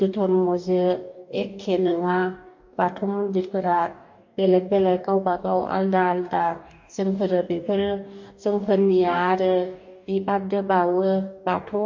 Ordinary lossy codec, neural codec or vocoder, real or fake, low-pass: MP3, 48 kbps; codec, 44.1 kHz, 2.6 kbps, DAC; fake; 7.2 kHz